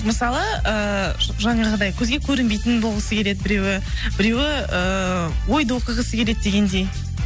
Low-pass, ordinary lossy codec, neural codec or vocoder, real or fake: none; none; none; real